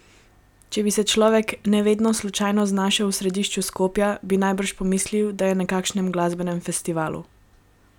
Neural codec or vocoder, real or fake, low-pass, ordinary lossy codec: none; real; 19.8 kHz; none